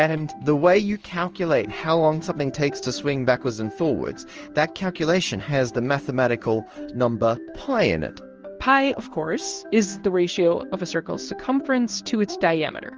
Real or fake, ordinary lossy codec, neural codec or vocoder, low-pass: fake; Opus, 24 kbps; codec, 16 kHz in and 24 kHz out, 1 kbps, XY-Tokenizer; 7.2 kHz